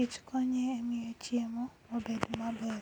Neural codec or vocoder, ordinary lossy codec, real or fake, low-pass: none; none; real; 19.8 kHz